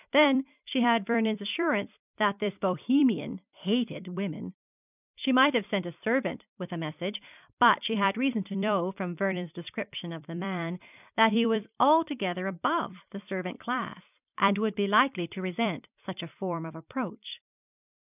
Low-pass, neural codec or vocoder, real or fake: 3.6 kHz; vocoder, 44.1 kHz, 128 mel bands every 256 samples, BigVGAN v2; fake